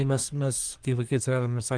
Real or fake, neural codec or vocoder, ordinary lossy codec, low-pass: fake; codec, 24 kHz, 1 kbps, SNAC; Opus, 24 kbps; 9.9 kHz